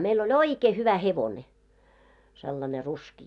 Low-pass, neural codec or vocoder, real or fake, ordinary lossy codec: 10.8 kHz; none; real; none